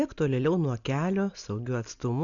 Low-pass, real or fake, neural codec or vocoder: 7.2 kHz; real; none